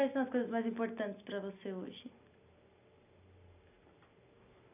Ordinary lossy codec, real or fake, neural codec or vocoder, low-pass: none; real; none; 3.6 kHz